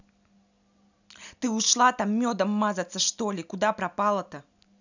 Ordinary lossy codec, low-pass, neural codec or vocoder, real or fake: none; 7.2 kHz; none; real